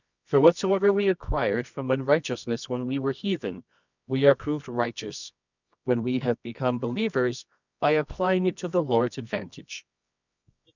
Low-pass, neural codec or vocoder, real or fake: 7.2 kHz; codec, 24 kHz, 0.9 kbps, WavTokenizer, medium music audio release; fake